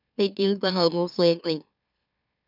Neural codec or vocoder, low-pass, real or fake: autoencoder, 44.1 kHz, a latent of 192 numbers a frame, MeloTTS; 5.4 kHz; fake